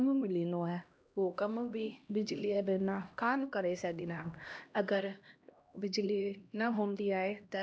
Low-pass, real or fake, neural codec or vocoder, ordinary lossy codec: none; fake; codec, 16 kHz, 1 kbps, X-Codec, HuBERT features, trained on LibriSpeech; none